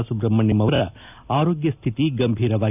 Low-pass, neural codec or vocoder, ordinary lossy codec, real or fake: 3.6 kHz; none; none; real